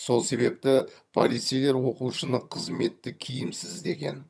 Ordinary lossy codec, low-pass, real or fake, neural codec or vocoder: none; none; fake; vocoder, 22.05 kHz, 80 mel bands, HiFi-GAN